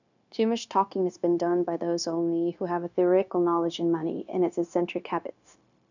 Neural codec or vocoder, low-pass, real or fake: codec, 16 kHz, 0.9 kbps, LongCat-Audio-Codec; 7.2 kHz; fake